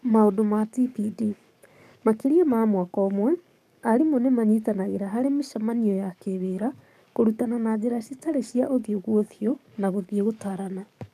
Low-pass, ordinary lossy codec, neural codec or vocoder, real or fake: 14.4 kHz; none; codec, 44.1 kHz, 7.8 kbps, DAC; fake